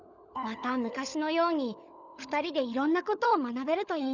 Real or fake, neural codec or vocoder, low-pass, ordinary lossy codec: fake; codec, 16 kHz, 16 kbps, FunCodec, trained on LibriTTS, 50 frames a second; 7.2 kHz; none